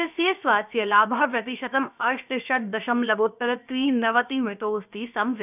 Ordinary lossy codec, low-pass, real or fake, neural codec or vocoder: none; 3.6 kHz; fake; codec, 16 kHz, about 1 kbps, DyCAST, with the encoder's durations